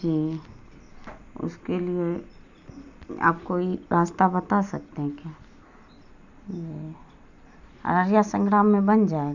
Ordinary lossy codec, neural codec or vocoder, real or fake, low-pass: none; none; real; 7.2 kHz